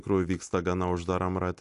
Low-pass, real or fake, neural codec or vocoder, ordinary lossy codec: 10.8 kHz; real; none; AAC, 64 kbps